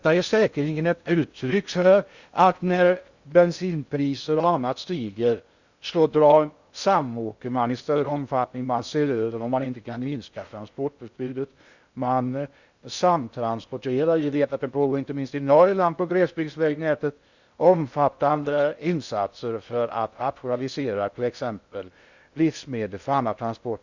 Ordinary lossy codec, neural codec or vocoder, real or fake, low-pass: Opus, 64 kbps; codec, 16 kHz in and 24 kHz out, 0.6 kbps, FocalCodec, streaming, 2048 codes; fake; 7.2 kHz